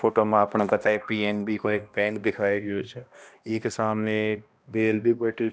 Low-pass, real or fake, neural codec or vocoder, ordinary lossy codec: none; fake; codec, 16 kHz, 1 kbps, X-Codec, HuBERT features, trained on balanced general audio; none